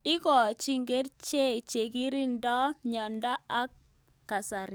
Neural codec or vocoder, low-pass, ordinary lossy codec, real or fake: codec, 44.1 kHz, 7.8 kbps, DAC; none; none; fake